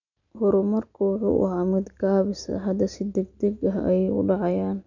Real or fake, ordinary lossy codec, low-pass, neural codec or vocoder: real; none; 7.2 kHz; none